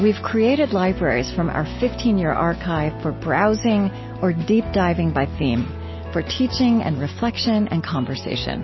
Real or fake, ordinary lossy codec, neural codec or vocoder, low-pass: real; MP3, 24 kbps; none; 7.2 kHz